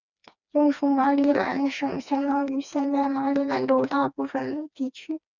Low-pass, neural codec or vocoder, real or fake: 7.2 kHz; codec, 16 kHz, 2 kbps, FreqCodec, smaller model; fake